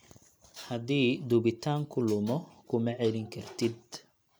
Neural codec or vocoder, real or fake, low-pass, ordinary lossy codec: none; real; none; none